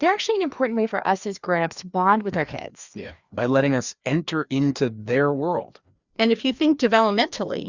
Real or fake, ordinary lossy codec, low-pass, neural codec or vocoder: fake; Opus, 64 kbps; 7.2 kHz; codec, 16 kHz, 2 kbps, FreqCodec, larger model